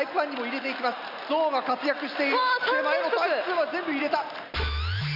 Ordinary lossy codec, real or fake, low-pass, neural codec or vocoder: none; real; 5.4 kHz; none